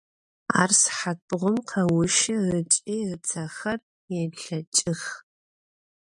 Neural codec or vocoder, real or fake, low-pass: none; real; 10.8 kHz